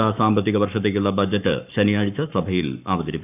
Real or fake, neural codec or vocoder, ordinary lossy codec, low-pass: fake; codec, 44.1 kHz, 7.8 kbps, Pupu-Codec; none; 3.6 kHz